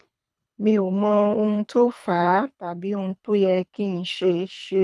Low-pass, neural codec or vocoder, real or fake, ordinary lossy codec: none; codec, 24 kHz, 3 kbps, HILCodec; fake; none